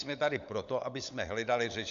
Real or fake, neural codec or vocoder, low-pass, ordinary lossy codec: fake; codec, 16 kHz, 16 kbps, FunCodec, trained on LibriTTS, 50 frames a second; 7.2 kHz; MP3, 64 kbps